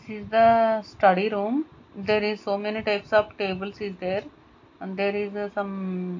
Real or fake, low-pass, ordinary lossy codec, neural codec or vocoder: real; 7.2 kHz; AAC, 48 kbps; none